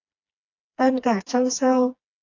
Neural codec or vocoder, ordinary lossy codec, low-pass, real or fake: codec, 16 kHz, 2 kbps, FreqCodec, smaller model; AAC, 48 kbps; 7.2 kHz; fake